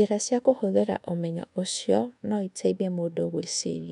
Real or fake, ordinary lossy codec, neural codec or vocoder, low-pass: fake; none; codec, 24 kHz, 1.2 kbps, DualCodec; 10.8 kHz